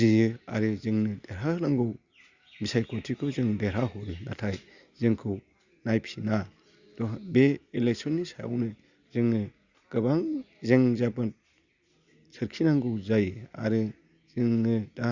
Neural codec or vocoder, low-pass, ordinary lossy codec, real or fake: none; 7.2 kHz; Opus, 64 kbps; real